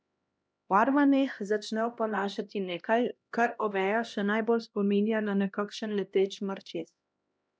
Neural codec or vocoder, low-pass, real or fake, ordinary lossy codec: codec, 16 kHz, 1 kbps, X-Codec, HuBERT features, trained on LibriSpeech; none; fake; none